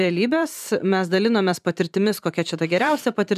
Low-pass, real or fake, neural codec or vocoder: 14.4 kHz; fake; vocoder, 44.1 kHz, 128 mel bands every 512 samples, BigVGAN v2